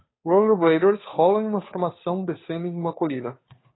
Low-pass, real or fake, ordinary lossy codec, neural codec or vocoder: 7.2 kHz; fake; AAC, 16 kbps; codec, 16 kHz, 2 kbps, FunCodec, trained on Chinese and English, 25 frames a second